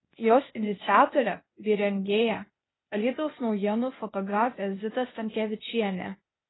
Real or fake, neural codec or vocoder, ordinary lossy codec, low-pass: fake; codec, 16 kHz, 0.8 kbps, ZipCodec; AAC, 16 kbps; 7.2 kHz